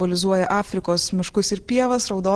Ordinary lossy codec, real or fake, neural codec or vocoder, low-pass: Opus, 16 kbps; real; none; 10.8 kHz